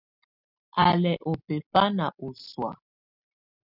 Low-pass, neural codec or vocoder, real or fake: 5.4 kHz; none; real